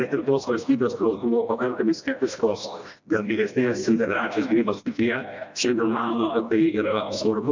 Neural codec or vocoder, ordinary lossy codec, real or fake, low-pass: codec, 16 kHz, 1 kbps, FreqCodec, smaller model; MP3, 64 kbps; fake; 7.2 kHz